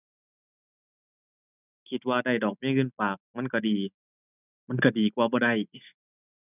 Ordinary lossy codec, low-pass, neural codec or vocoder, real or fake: none; 3.6 kHz; none; real